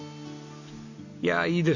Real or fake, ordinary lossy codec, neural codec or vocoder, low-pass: real; none; none; 7.2 kHz